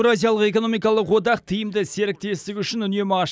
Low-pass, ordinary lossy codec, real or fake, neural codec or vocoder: none; none; real; none